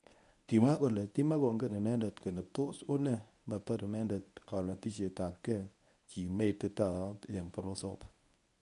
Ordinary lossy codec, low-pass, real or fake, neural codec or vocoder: none; 10.8 kHz; fake; codec, 24 kHz, 0.9 kbps, WavTokenizer, medium speech release version 1